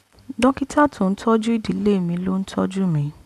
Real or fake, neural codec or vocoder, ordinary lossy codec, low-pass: real; none; AAC, 96 kbps; 14.4 kHz